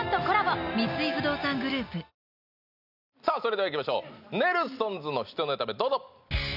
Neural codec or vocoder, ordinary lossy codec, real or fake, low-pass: none; none; real; 5.4 kHz